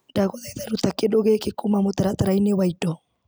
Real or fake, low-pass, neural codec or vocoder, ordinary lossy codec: real; none; none; none